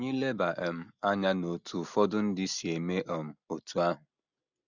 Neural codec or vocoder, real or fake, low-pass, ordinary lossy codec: none; real; 7.2 kHz; none